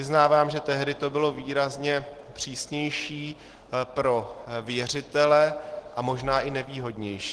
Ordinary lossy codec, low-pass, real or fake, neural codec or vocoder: Opus, 16 kbps; 10.8 kHz; real; none